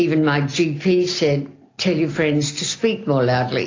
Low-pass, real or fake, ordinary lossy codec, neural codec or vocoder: 7.2 kHz; real; AAC, 32 kbps; none